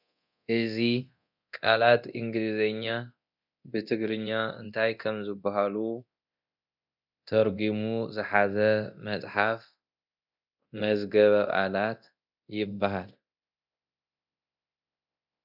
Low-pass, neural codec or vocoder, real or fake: 5.4 kHz; codec, 24 kHz, 0.9 kbps, DualCodec; fake